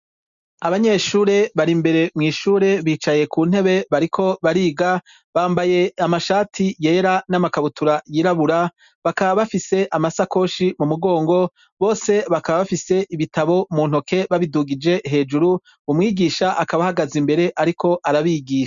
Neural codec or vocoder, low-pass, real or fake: none; 7.2 kHz; real